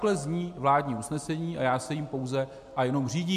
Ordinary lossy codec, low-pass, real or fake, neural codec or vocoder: MP3, 64 kbps; 14.4 kHz; real; none